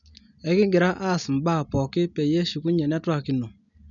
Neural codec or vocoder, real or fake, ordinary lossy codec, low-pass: none; real; none; 7.2 kHz